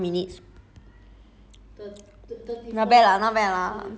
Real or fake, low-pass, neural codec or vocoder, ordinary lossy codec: real; none; none; none